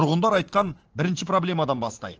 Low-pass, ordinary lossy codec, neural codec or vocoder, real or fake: 7.2 kHz; Opus, 16 kbps; vocoder, 44.1 kHz, 80 mel bands, Vocos; fake